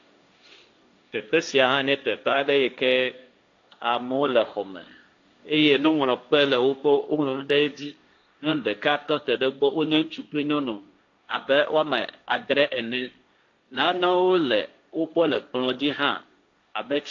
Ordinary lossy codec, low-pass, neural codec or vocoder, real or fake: AAC, 48 kbps; 7.2 kHz; codec, 16 kHz, 1.1 kbps, Voila-Tokenizer; fake